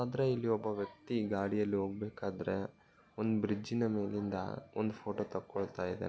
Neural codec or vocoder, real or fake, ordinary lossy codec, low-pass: none; real; none; none